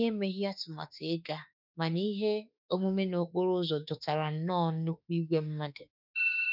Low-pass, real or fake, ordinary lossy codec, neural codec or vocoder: 5.4 kHz; fake; none; autoencoder, 48 kHz, 32 numbers a frame, DAC-VAE, trained on Japanese speech